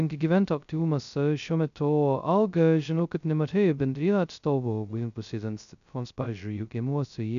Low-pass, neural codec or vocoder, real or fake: 7.2 kHz; codec, 16 kHz, 0.2 kbps, FocalCodec; fake